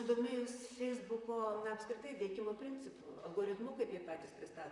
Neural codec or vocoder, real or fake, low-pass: vocoder, 44.1 kHz, 128 mel bands, Pupu-Vocoder; fake; 10.8 kHz